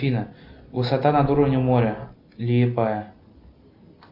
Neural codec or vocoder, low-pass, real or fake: none; 5.4 kHz; real